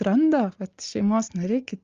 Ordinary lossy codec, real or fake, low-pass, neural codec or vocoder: Opus, 32 kbps; real; 7.2 kHz; none